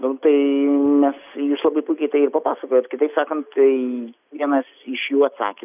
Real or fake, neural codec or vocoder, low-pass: real; none; 3.6 kHz